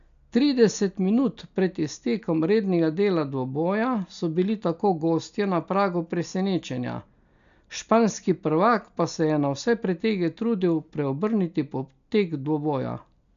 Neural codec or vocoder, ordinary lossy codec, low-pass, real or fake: none; none; 7.2 kHz; real